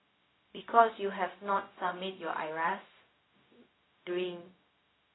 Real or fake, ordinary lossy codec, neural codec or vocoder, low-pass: fake; AAC, 16 kbps; codec, 16 kHz, 0.4 kbps, LongCat-Audio-Codec; 7.2 kHz